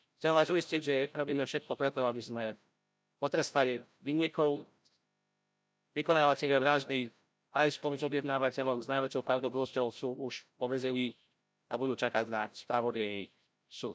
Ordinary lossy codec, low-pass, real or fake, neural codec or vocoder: none; none; fake; codec, 16 kHz, 0.5 kbps, FreqCodec, larger model